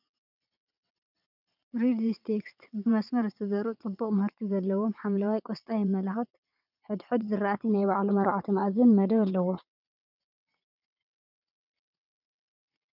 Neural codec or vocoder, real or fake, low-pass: vocoder, 22.05 kHz, 80 mel bands, WaveNeXt; fake; 5.4 kHz